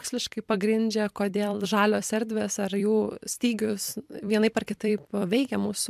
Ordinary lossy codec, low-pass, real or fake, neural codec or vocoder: MP3, 96 kbps; 14.4 kHz; fake; vocoder, 44.1 kHz, 128 mel bands every 256 samples, BigVGAN v2